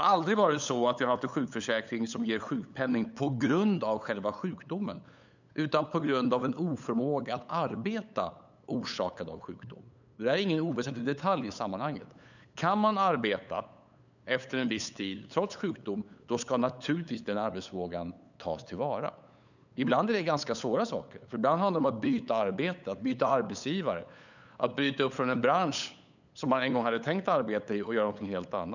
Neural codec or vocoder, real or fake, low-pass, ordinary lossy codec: codec, 16 kHz, 8 kbps, FunCodec, trained on LibriTTS, 25 frames a second; fake; 7.2 kHz; none